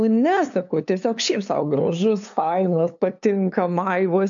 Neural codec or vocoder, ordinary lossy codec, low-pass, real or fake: codec, 16 kHz, 2 kbps, FunCodec, trained on LibriTTS, 25 frames a second; AAC, 64 kbps; 7.2 kHz; fake